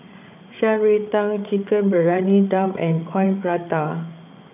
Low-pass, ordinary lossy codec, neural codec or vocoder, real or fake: 3.6 kHz; none; codec, 16 kHz, 8 kbps, FreqCodec, larger model; fake